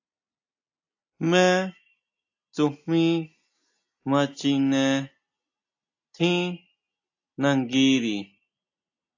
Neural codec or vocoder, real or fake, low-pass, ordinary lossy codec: none; real; 7.2 kHz; AAC, 48 kbps